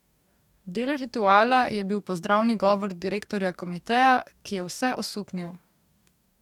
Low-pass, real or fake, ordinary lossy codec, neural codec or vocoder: 19.8 kHz; fake; none; codec, 44.1 kHz, 2.6 kbps, DAC